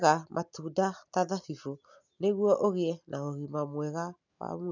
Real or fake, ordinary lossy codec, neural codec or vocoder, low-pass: real; none; none; 7.2 kHz